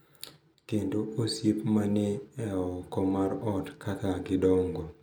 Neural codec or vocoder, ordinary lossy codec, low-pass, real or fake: none; none; none; real